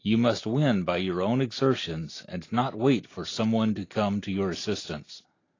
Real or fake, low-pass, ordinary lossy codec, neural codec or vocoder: real; 7.2 kHz; AAC, 32 kbps; none